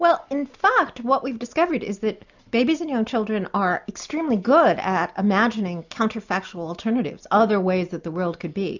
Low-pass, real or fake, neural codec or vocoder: 7.2 kHz; real; none